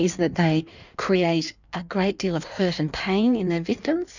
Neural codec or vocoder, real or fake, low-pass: codec, 16 kHz in and 24 kHz out, 1.1 kbps, FireRedTTS-2 codec; fake; 7.2 kHz